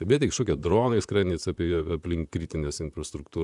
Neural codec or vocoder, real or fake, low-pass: vocoder, 44.1 kHz, 128 mel bands, Pupu-Vocoder; fake; 10.8 kHz